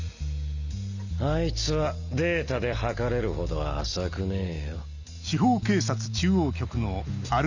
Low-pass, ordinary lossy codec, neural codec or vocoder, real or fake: 7.2 kHz; none; none; real